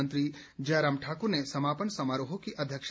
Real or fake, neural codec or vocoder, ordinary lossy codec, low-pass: real; none; none; none